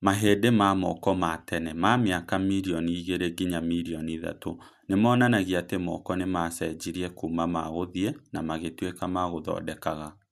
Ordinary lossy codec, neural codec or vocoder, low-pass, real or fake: none; none; 14.4 kHz; real